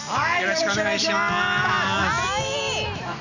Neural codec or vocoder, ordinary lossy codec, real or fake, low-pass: none; none; real; 7.2 kHz